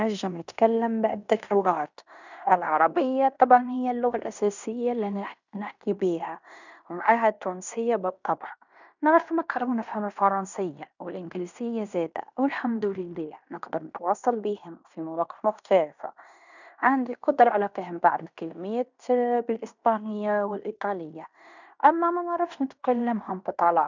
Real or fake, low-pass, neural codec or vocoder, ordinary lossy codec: fake; 7.2 kHz; codec, 16 kHz in and 24 kHz out, 0.9 kbps, LongCat-Audio-Codec, fine tuned four codebook decoder; none